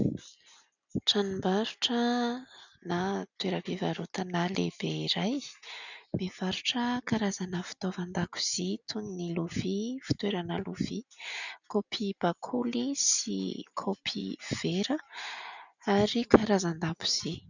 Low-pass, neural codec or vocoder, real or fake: 7.2 kHz; none; real